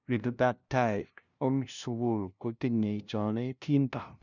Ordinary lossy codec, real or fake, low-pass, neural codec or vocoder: none; fake; 7.2 kHz; codec, 16 kHz, 0.5 kbps, FunCodec, trained on LibriTTS, 25 frames a second